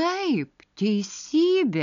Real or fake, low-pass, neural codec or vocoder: real; 7.2 kHz; none